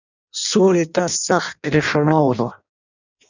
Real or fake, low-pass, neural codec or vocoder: fake; 7.2 kHz; codec, 16 kHz in and 24 kHz out, 0.6 kbps, FireRedTTS-2 codec